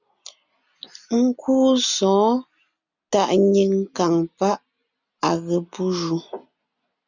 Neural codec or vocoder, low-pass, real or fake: none; 7.2 kHz; real